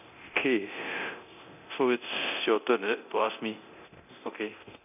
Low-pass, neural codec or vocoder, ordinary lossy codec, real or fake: 3.6 kHz; codec, 24 kHz, 0.9 kbps, DualCodec; none; fake